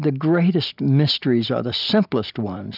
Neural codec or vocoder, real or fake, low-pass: none; real; 5.4 kHz